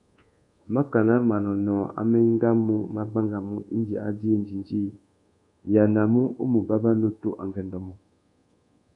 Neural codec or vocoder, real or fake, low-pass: codec, 24 kHz, 1.2 kbps, DualCodec; fake; 10.8 kHz